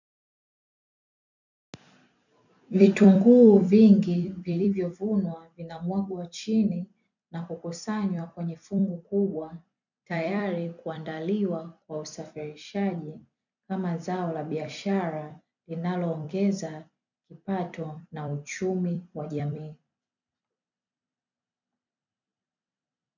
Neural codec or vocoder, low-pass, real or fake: vocoder, 44.1 kHz, 128 mel bands every 256 samples, BigVGAN v2; 7.2 kHz; fake